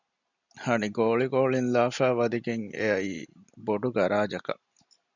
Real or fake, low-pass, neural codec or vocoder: real; 7.2 kHz; none